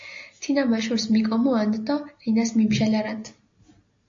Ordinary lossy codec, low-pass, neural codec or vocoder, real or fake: AAC, 48 kbps; 7.2 kHz; none; real